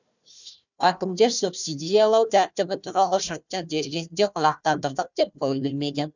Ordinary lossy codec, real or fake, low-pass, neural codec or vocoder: none; fake; 7.2 kHz; codec, 16 kHz, 1 kbps, FunCodec, trained on Chinese and English, 50 frames a second